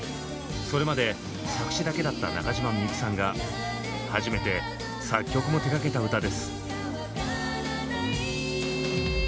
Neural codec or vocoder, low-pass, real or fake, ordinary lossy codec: none; none; real; none